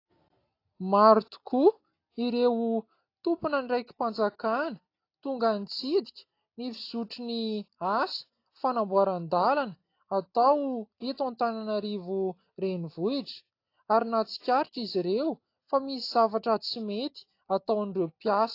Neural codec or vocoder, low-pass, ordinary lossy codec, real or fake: none; 5.4 kHz; AAC, 32 kbps; real